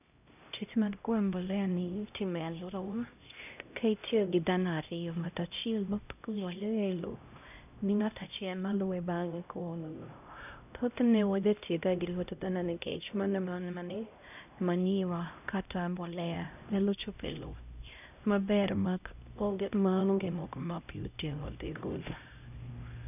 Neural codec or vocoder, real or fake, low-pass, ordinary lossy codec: codec, 16 kHz, 0.5 kbps, X-Codec, HuBERT features, trained on LibriSpeech; fake; 3.6 kHz; none